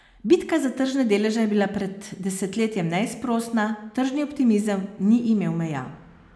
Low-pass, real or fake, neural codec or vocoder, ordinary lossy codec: none; real; none; none